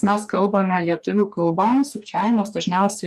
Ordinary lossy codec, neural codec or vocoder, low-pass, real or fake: MP3, 96 kbps; codec, 44.1 kHz, 2.6 kbps, DAC; 14.4 kHz; fake